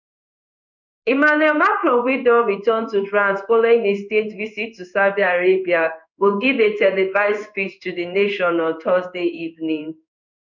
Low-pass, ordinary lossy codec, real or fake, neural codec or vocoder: 7.2 kHz; none; fake; codec, 16 kHz in and 24 kHz out, 1 kbps, XY-Tokenizer